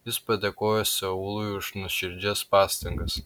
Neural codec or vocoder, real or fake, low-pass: none; real; 19.8 kHz